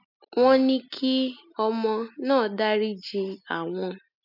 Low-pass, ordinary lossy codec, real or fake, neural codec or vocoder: 5.4 kHz; none; real; none